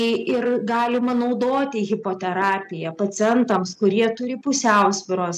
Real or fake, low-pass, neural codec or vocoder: real; 14.4 kHz; none